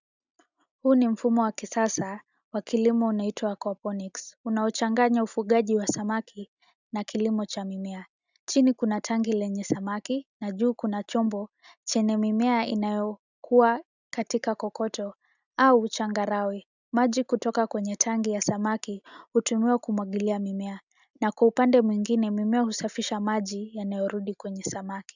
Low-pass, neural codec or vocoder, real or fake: 7.2 kHz; none; real